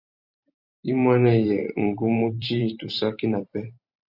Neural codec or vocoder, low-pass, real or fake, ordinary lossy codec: vocoder, 44.1 kHz, 128 mel bands every 512 samples, BigVGAN v2; 5.4 kHz; fake; Opus, 64 kbps